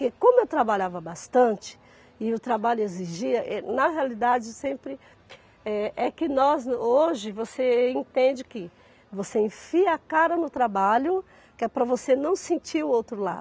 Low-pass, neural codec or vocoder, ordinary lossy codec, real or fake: none; none; none; real